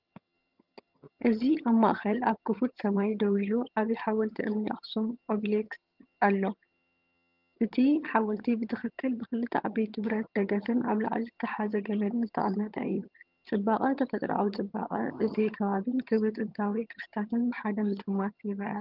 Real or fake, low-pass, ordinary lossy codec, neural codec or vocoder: fake; 5.4 kHz; Opus, 32 kbps; vocoder, 22.05 kHz, 80 mel bands, HiFi-GAN